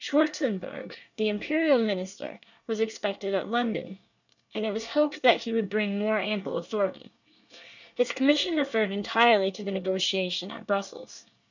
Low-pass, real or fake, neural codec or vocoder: 7.2 kHz; fake; codec, 24 kHz, 1 kbps, SNAC